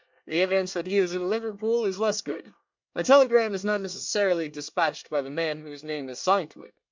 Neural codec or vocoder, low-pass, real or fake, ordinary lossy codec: codec, 24 kHz, 1 kbps, SNAC; 7.2 kHz; fake; MP3, 64 kbps